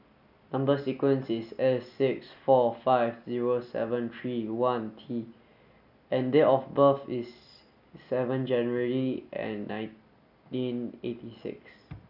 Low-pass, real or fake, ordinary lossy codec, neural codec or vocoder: 5.4 kHz; real; none; none